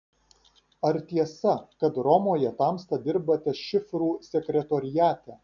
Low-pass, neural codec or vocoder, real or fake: 7.2 kHz; none; real